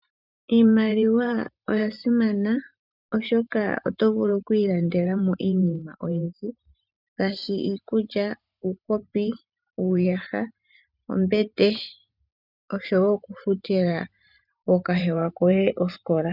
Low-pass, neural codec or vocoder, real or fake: 5.4 kHz; vocoder, 44.1 kHz, 128 mel bands every 512 samples, BigVGAN v2; fake